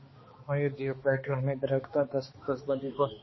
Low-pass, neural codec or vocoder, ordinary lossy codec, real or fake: 7.2 kHz; codec, 16 kHz, 2 kbps, X-Codec, HuBERT features, trained on balanced general audio; MP3, 24 kbps; fake